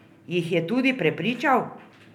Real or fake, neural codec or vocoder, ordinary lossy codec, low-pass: real; none; none; 19.8 kHz